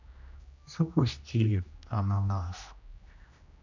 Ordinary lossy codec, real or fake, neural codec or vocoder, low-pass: none; fake; codec, 16 kHz, 1 kbps, X-Codec, HuBERT features, trained on general audio; 7.2 kHz